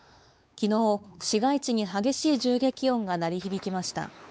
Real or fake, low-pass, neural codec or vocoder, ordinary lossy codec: fake; none; codec, 16 kHz, 4 kbps, X-Codec, WavLM features, trained on Multilingual LibriSpeech; none